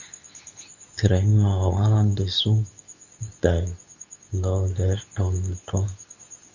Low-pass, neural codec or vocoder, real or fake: 7.2 kHz; codec, 24 kHz, 0.9 kbps, WavTokenizer, medium speech release version 2; fake